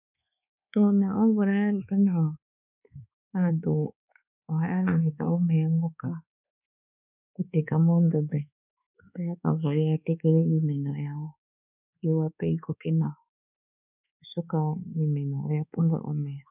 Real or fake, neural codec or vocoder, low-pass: fake; codec, 24 kHz, 1.2 kbps, DualCodec; 3.6 kHz